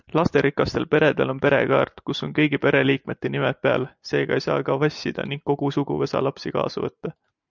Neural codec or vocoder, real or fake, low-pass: none; real; 7.2 kHz